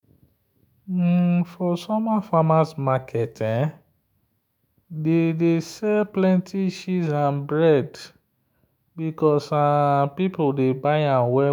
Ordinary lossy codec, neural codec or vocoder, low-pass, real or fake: none; autoencoder, 48 kHz, 128 numbers a frame, DAC-VAE, trained on Japanese speech; 19.8 kHz; fake